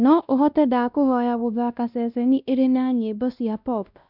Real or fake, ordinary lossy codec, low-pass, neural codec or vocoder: fake; none; 5.4 kHz; codec, 16 kHz, 1 kbps, X-Codec, WavLM features, trained on Multilingual LibriSpeech